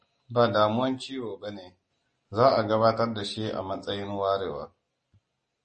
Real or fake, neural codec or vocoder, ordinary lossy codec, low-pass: real; none; MP3, 32 kbps; 10.8 kHz